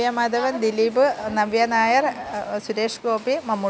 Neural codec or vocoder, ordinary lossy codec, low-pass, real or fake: none; none; none; real